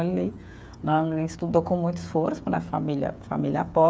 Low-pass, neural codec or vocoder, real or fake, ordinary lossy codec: none; codec, 16 kHz, 8 kbps, FreqCodec, smaller model; fake; none